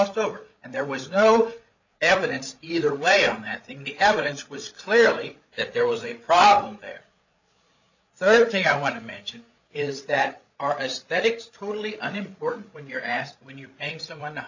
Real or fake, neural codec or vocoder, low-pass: fake; codec, 16 kHz, 8 kbps, FreqCodec, larger model; 7.2 kHz